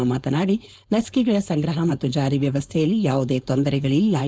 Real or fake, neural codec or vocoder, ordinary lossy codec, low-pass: fake; codec, 16 kHz, 4.8 kbps, FACodec; none; none